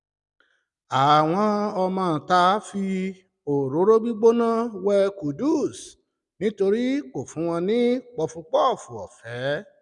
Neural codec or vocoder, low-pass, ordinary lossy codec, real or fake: none; 10.8 kHz; none; real